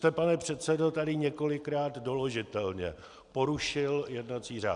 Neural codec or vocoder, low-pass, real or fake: none; 10.8 kHz; real